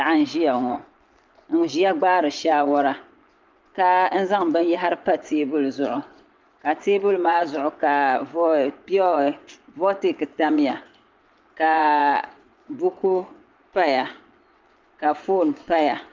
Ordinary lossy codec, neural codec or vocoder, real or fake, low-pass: Opus, 24 kbps; vocoder, 24 kHz, 100 mel bands, Vocos; fake; 7.2 kHz